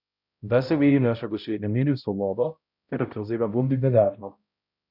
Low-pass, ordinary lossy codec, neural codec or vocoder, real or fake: 5.4 kHz; none; codec, 16 kHz, 0.5 kbps, X-Codec, HuBERT features, trained on balanced general audio; fake